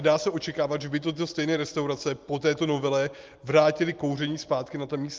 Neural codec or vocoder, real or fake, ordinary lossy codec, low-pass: none; real; Opus, 32 kbps; 7.2 kHz